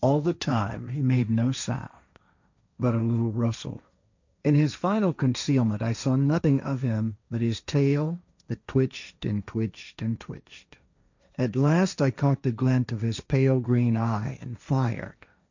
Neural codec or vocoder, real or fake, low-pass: codec, 16 kHz, 1.1 kbps, Voila-Tokenizer; fake; 7.2 kHz